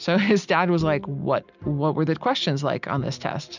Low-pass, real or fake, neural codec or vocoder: 7.2 kHz; real; none